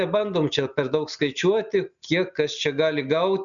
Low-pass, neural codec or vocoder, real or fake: 7.2 kHz; none; real